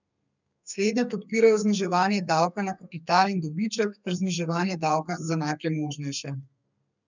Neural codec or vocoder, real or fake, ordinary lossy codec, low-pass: codec, 32 kHz, 1.9 kbps, SNAC; fake; none; 7.2 kHz